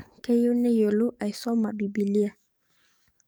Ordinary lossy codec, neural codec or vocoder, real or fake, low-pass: none; codec, 44.1 kHz, 7.8 kbps, DAC; fake; none